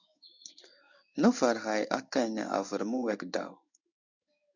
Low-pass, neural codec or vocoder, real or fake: 7.2 kHz; codec, 16 kHz in and 24 kHz out, 1 kbps, XY-Tokenizer; fake